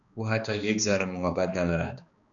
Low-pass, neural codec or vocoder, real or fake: 7.2 kHz; codec, 16 kHz, 2 kbps, X-Codec, HuBERT features, trained on balanced general audio; fake